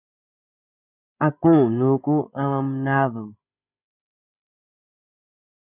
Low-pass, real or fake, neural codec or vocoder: 3.6 kHz; fake; codec, 16 kHz, 16 kbps, FreqCodec, larger model